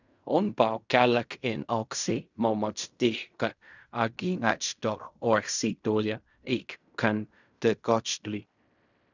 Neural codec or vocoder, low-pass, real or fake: codec, 16 kHz in and 24 kHz out, 0.4 kbps, LongCat-Audio-Codec, fine tuned four codebook decoder; 7.2 kHz; fake